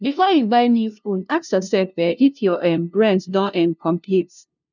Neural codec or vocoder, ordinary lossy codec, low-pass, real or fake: codec, 16 kHz, 0.5 kbps, FunCodec, trained on LibriTTS, 25 frames a second; none; 7.2 kHz; fake